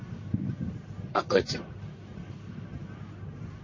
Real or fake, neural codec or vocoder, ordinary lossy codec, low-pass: fake; codec, 44.1 kHz, 1.7 kbps, Pupu-Codec; MP3, 32 kbps; 7.2 kHz